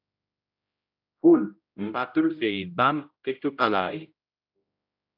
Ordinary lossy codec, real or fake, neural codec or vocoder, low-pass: AAC, 48 kbps; fake; codec, 16 kHz, 0.5 kbps, X-Codec, HuBERT features, trained on general audio; 5.4 kHz